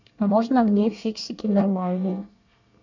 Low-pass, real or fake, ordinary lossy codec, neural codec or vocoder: 7.2 kHz; fake; none; codec, 24 kHz, 1 kbps, SNAC